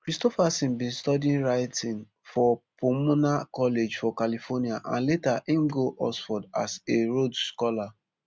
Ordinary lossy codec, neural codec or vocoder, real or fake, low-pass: none; none; real; none